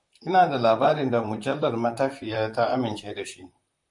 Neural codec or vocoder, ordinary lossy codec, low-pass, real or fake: vocoder, 44.1 kHz, 128 mel bands, Pupu-Vocoder; MP3, 64 kbps; 10.8 kHz; fake